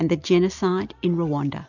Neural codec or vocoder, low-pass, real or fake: none; 7.2 kHz; real